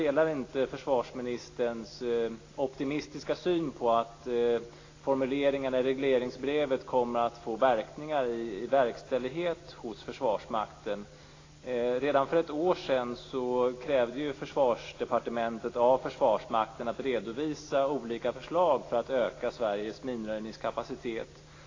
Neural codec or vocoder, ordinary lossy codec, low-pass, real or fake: none; AAC, 32 kbps; 7.2 kHz; real